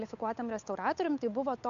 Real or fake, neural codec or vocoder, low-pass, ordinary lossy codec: fake; codec, 16 kHz, 8 kbps, FunCodec, trained on Chinese and English, 25 frames a second; 7.2 kHz; MP3, 64 kbps